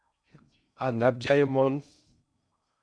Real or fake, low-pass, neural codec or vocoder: fake; 9.9 kHz; codec, 16 kHz in and 24 kHz out, 0.6 kbps, FocalCodec, streaming, 2048 codes